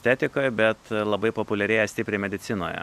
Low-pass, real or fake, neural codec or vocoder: 14.4 kHz; real; none